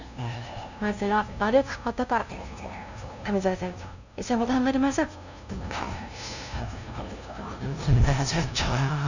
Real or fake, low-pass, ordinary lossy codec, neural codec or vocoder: fake; 7.2 kHz; none; codec, 16 kHz, 0.5 kbps, FunCodec, trained on LibriTTS, 25 frames a second